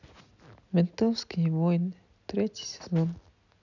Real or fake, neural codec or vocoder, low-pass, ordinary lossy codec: real; none; 7.2 kHz; none